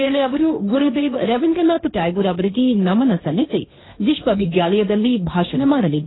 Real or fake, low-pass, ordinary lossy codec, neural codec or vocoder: fake; 7.2 kHz; AAC, 16 kbps; codec, 16 kHz, 1.1 kbps, Voila-Tokenizer